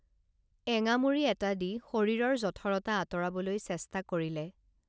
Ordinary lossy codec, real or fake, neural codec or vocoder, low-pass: none; real; none; none